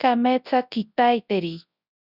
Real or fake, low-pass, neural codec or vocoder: fake; 5.4 kHz; codec, 24 kHz, 0.9 kbps, WavTokenizer, large speech release